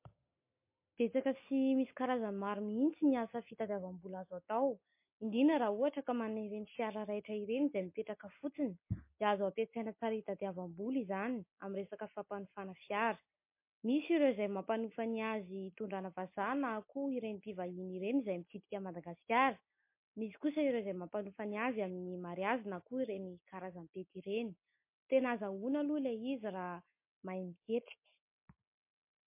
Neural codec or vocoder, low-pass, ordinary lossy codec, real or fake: none; 3.6 kHz; MP3, 24 kbps; real